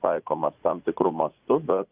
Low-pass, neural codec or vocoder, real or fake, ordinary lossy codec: 3.6 kHz; vocoder, 44.1 kHz, 80 mel bands, Vocos; fake; Opus, 24 kbps